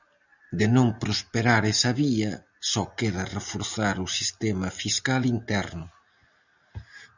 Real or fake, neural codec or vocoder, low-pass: real; none; 7.2 kHz